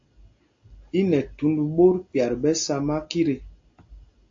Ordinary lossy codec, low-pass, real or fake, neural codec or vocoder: AAC, 48 kbps; 7.2 kHz; real; none